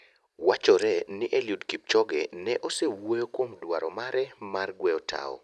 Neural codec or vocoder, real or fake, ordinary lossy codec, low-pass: none; real; none; none